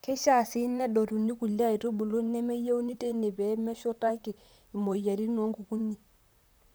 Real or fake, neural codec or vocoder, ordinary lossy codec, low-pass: fake; vocoder, 44.1 kHz, 128 mel bands, Pupu-Vocoder; none; none